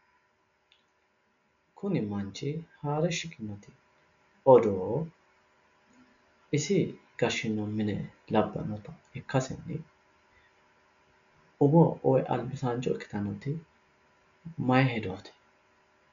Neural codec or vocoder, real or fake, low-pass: none; real; 7.2 kHz